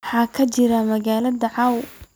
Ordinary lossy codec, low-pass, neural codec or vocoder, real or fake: none; none; none; real